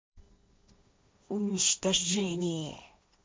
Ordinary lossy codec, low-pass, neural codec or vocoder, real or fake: none; none; codec, 16 kHz, 1.1 kbps, Voila-Tokenizer; fake